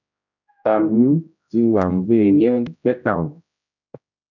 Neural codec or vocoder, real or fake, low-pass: codec, 16 kHz, 0.5 kbps, X-Codec, HuBERT features, trained on general audio; fake; 7.2 kHz